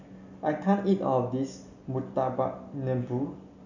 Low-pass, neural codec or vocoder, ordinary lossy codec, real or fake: 7.2 kHz; none; none; real